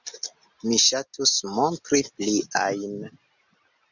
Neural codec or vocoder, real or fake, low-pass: none; real; 7.2 kHz